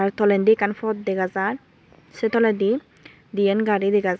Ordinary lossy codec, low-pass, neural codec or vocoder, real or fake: none; none; none; real